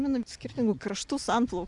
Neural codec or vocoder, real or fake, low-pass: none; real; 10.8 kHz